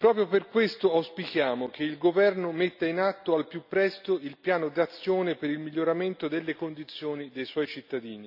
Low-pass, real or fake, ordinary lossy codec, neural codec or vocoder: 5.4 kHz; real; none; none